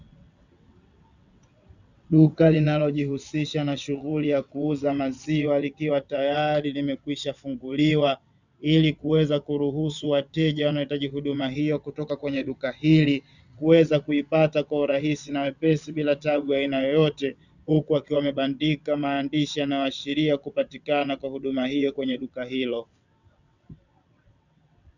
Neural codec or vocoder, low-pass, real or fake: vocoder, 22.05 kHz, 80 mel bands, WaveNeXt; 7.2 kHz; fake